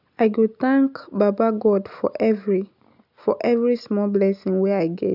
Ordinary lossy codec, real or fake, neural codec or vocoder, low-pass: none; real; none; 5.4 kHz